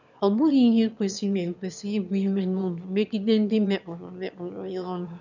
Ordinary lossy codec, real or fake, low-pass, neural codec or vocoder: none; fake; 7.2 kHz; autoencoder, 22.05 kHz, a latent of 192 numbers a frame, VITS, trained on one speaker